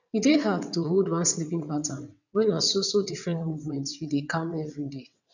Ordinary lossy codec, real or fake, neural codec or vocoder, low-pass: none; fake; vocoder, 22.05 kHz, 80 mel bands, WaveNeXt; 7.2 kHz